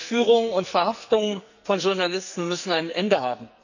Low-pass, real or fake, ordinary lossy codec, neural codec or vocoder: 7.2 kHz; fake; none; codec, 44.1 kHz, 2.6 kbps, SNAC